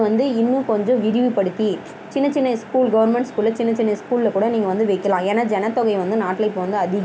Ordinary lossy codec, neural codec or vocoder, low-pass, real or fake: none; none; none; real